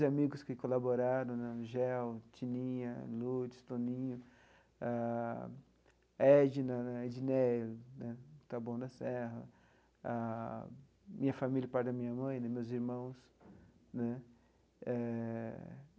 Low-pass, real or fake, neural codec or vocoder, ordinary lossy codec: none; real; none; none